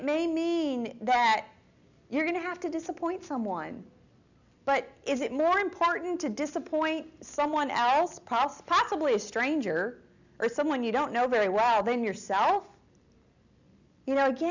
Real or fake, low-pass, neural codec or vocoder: real; 7.2 kHz; none